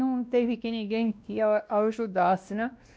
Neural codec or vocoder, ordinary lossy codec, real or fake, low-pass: codec, 16 kHz, 1 kbps, X-Codec, WavLM features, trained on Multilingual LibriSpeech; none; fake; none